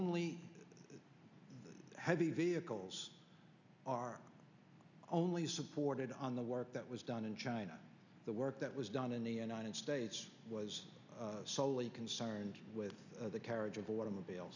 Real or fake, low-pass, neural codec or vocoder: real; 7.2 kHz; none